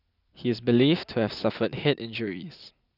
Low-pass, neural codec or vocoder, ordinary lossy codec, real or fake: 5.4 kHz; none; none; real